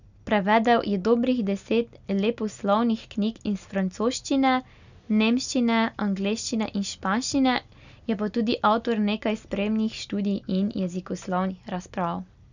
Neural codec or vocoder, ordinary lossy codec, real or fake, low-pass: none; none; real; 7.2 kHz